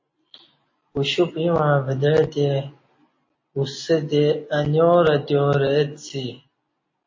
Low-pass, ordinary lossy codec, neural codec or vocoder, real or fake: 7.2 kHz; MP3, 32 kbps; none; real